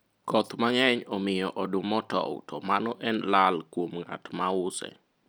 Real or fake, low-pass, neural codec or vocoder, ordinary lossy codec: real; none; none; none